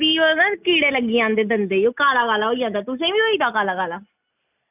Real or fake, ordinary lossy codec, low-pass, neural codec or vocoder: real; none; 3.6 kHz; none